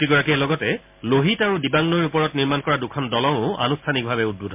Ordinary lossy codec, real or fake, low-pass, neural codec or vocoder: MP3, 32 kbps; real; 3.6 kHz; none